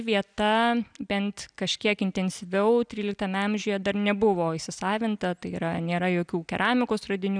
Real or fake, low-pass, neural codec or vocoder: real; 9.9 kHz; none